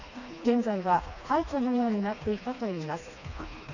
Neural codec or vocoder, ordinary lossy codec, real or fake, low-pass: codec, 16 kHz, 2 kbps, FreqCodec, smaller model; none; fake; 7.2 kHz